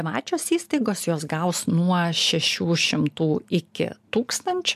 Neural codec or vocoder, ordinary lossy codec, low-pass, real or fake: none; MP3, 96 kbps; 14.4 kHz; real